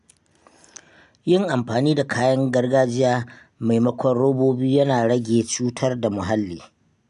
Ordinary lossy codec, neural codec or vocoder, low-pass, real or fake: none; none; 10.8 kHz; real